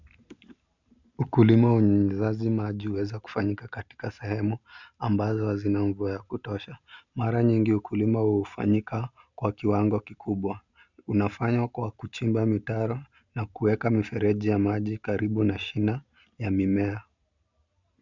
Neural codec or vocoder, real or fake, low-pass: none; real; 7.2 kHz